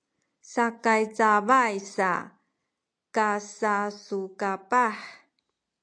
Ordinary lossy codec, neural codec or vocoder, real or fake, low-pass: AAC, 64 kbps; none; real; 9.9 kHz